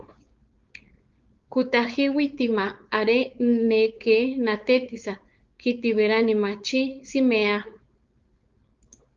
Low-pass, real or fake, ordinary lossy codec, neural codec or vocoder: 7.2 kHz; fake; Opus, 32 kbps; codec, 16 kHz, 4.8 kbps, FACodec